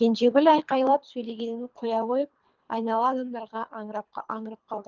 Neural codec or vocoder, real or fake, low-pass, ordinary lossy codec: codec, 24 kHz, 3 kbps, HILCodec; fake; 7.2 kHz; Opus, 32 kbps